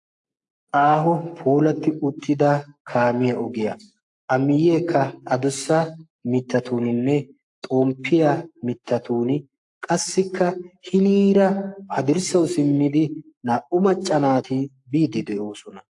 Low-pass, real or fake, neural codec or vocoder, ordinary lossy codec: 10.8 kHz; fake; codec, 44.1 kHz, 7.8 kbps, Pupu-Codec; AAC, 64 kbps